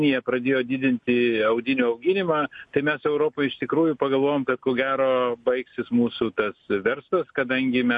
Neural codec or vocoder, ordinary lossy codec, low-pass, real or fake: none; MP3, 48 kbps; 10.8 kHz; real